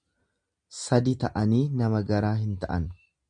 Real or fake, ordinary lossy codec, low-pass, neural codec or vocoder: real; MP3, 64 kbps; 9.9 kHz; none